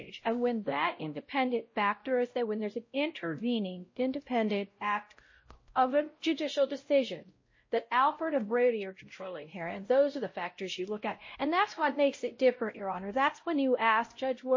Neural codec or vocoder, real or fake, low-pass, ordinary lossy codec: codec, 16 kHz, 0.5 kbps, X-Codec, WavLM features, trained on Multilingual LibriSpeech; fake; 7.2 kHz; MP3, 32 kbps